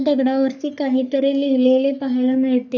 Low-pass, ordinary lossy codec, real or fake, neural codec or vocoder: 7.2 kHz; none; fake; codec, 44.1 kHz, 3.4 kbps, Pupu-Codec